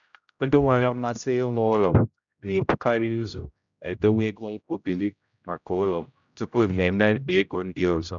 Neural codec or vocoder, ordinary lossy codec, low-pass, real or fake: codec, 16 kHz, 0.5 kbps, X-Codec, HuBERT features, trained on general audio; none; 7.2 kHz; fake